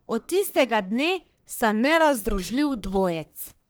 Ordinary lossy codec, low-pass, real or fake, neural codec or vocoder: none; none; fake; codec, 44.1 kHz, 1.7 kbps, Pupu-Codec